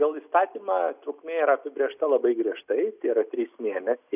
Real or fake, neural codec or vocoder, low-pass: real; none; 3.6 kHz